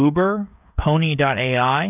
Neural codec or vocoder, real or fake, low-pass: codec, 16 kHz, 16 kbps, FreqCodec, smaller model; fake; 3.6 kHz